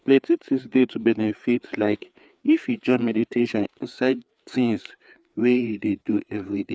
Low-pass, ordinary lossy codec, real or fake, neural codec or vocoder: none; none; fake; codec, 16 kHz, 4 kbps, FreqCodec, larger model